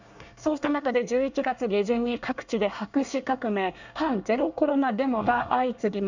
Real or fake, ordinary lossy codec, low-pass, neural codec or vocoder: fake; none; 7.2 kHz; codec, 24 kHz, 1 kbps, SNAC